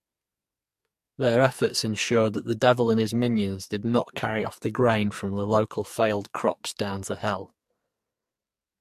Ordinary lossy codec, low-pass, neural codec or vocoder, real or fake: MP3, 64 kbps; 14.4 kHz; codec, 44.1 kHz, 2.6 kbps, SNAC; fake